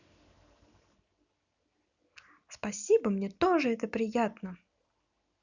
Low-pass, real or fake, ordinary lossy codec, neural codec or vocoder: 7.2 kHz; real; none; none